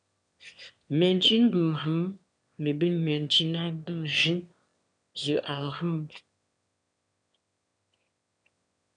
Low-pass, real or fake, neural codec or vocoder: 9.9 kHz; fake; autoencoder, 22.05 kHz, a latent of 192 numbers a frame, VITS, trained on one speaker